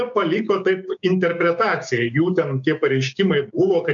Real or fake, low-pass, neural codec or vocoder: fake; 7.2 kHz; codec, 16 kHz, 6 kbps, DAC